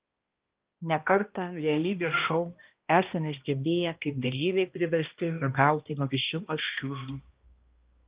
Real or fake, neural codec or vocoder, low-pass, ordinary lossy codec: fake; codec, 16 kHz, 1 kbps, X-Codec, HuBERT features, trained on balanced general audio; 3.6 kHz; Opus, 32 kbps